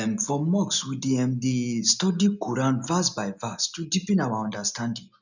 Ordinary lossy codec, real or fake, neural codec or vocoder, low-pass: none; real; none; 7.2 kHz